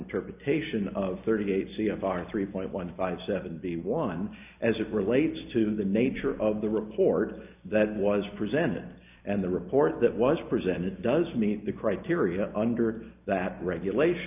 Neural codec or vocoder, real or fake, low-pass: none; real; 3.6 kHz